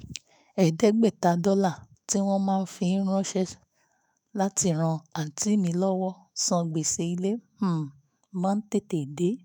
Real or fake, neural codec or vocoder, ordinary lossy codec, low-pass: fake; autoencoder, 48 kHz, 128 numbers a frame, DAC-VAE, trained on Japanese speech; none; none